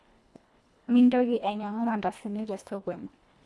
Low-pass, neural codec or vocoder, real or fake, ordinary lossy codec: none; codec, 24 kHz, 1.5 kbps, HILCodec; fake; none